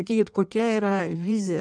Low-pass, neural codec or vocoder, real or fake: 9.9 kHz; codec, 16 kHz in and 24 kHz out, 1.1 kbps, FireRedTTS-2 codec; fake